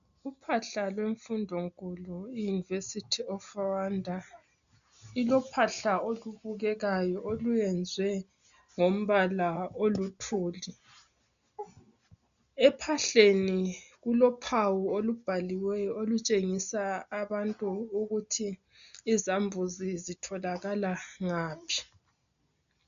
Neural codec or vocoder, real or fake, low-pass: none; real; 7.2 kHz